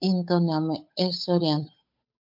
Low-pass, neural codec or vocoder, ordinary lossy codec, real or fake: 5.4 kHz; codec, 16 kHz, 8 kbps, FunCodec, trained on Chinese and English, 25 frames a second; MP3, 48 kbps; fake